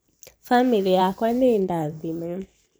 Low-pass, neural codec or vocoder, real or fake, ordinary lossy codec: none; vocoder, 44.1 kHz, 128 mel bands every 512 samples, BigVGAN v2; fake; none